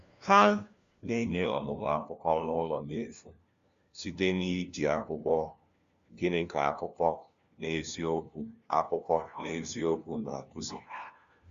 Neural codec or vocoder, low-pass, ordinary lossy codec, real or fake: codec, 16 kHz, 1 kbps, FunCodec, trained on LibriTTS, 50 frames a second; 7.2 kHz; Opus, 64 kbps; fake